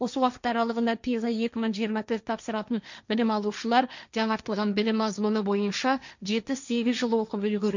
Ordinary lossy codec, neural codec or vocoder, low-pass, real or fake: none; codec, 16 kHz, 1.1 kbps, Voila-Tokenizer; 7.2 kHz; fake